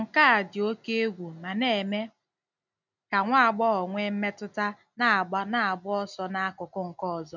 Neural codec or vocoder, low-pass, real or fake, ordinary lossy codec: none; 7.2 kHz; real; none